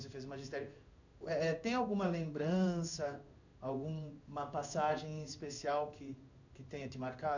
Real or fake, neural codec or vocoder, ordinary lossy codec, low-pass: fake; codec, 16 kHz in and 24 kHz out, 1 kbps, XY-Tokenizer; none; 7.2 kHz